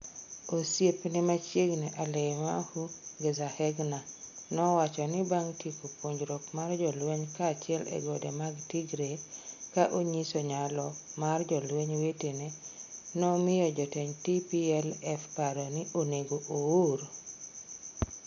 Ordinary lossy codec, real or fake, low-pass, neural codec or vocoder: none; real; 7.2 kHz; none